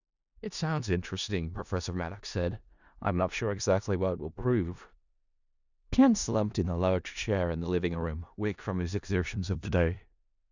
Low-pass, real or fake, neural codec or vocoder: 7.2 kHz; fake; codec, 16 kHz in and 24 kHz out, 0.4 kbps, LongCat-Audio-Codec, four codebook decoder